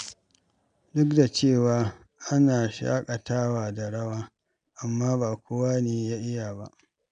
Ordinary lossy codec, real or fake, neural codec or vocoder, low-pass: none; real; none; 9.9 kHz